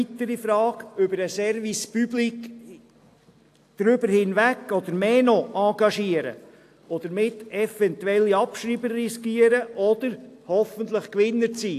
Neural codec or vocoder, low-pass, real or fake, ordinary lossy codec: none; 14.4 kHz; real; AAC, 64 kbps